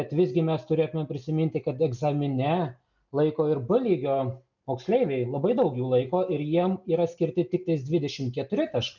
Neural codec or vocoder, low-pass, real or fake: none; 7.2 kHz; real